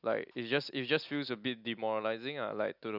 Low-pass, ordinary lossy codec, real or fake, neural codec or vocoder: 5.4 kHz; none; real; none